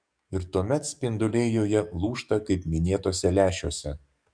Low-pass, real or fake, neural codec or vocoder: 9.9 kHz; fake; codec, 44.1 kHz, 7.8 kbps, DAC